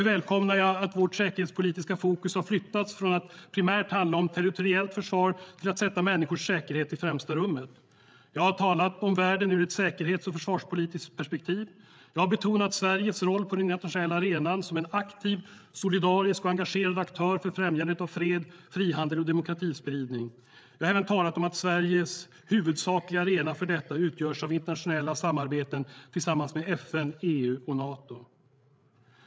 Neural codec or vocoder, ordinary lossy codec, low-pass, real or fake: codec, 16 kHz, 8 kbps, FreqCodec, larger model; none; none; fake